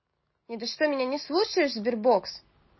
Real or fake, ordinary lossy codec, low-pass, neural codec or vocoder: real; MP3, 24 kbps; 7.2 kHz; none